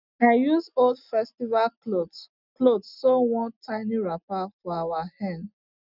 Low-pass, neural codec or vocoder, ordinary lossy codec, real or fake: 5.4 kHz; none; none; real